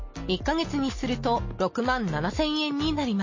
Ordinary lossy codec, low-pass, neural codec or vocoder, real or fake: MP3, 32 kbps; 7.2 kHz; none; real